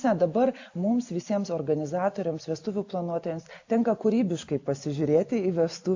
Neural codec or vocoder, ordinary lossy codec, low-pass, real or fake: none; AAC, 48 kbps; 7.2 kHz; real